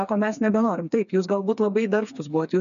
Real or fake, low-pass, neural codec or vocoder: fake; 7.2 kHz; codec, 16 kHz, 4 kbps, FreqCodec, smaller model